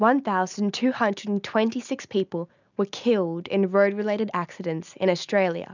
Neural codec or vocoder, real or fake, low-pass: none; real; 7.2 kHz